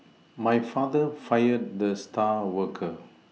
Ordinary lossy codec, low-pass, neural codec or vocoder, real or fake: none; none; none; real